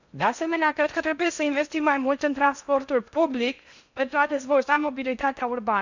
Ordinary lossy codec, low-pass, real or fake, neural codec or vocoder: none; 7.2 kHz; fake; codec, 16 kHz in and 24 kHz out, 0.6 kbps, FocalCodec, streaming, 2048 codes